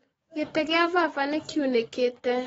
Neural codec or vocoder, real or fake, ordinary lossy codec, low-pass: codec, 44.1 kHz, 7.8 kbps, DAC; fake; AAC, 24 kbps; 19.8 kHz